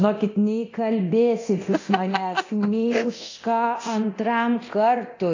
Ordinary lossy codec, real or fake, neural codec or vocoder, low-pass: AAC, 48 kbps; fake; codec, 24 kHz, 0.9 kbps, DualCodec; 7.2 kHz